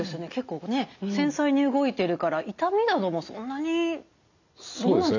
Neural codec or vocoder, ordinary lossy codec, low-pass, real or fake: none; none; 7.2 kHz; real